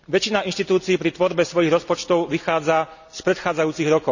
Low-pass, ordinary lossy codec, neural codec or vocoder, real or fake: 7.2 kHz; none; none; real